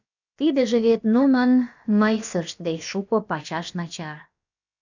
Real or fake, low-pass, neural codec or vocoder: fake; 7.2 kHz; codec, 16 kHz, about 1 kbps, DyCAST, with the encoder's durations